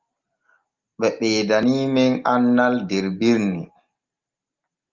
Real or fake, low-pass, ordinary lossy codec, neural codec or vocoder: real; 7.2 kHz; Opus, 32 kbps; none